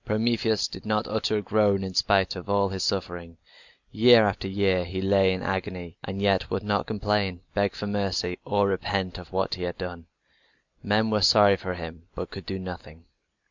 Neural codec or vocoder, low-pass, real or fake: none; 7.2 kHz; real